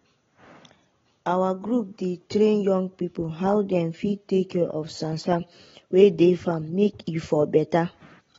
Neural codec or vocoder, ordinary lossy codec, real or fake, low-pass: none; AAC, 24 kbps; real; 7.2 kHz